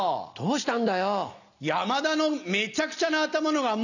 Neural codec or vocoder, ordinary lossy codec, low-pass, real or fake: none; none; 7.2 kHz; real